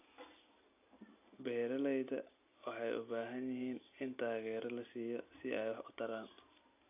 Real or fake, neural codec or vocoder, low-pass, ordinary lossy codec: real; none; 3.6 kHz; AAC, 32 kbps